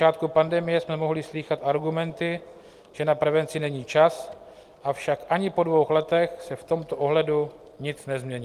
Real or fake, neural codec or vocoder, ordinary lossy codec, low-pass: real; none; Opus, 24 kbps; 14.4 kHz